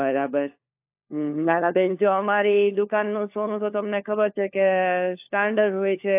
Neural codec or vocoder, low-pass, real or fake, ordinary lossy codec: codec, 16 kHz, 4 kbps, FunCodec, trained on LibriTTS, 50 frames a second; 3.6 kHz; fake; AAC, 32 kbps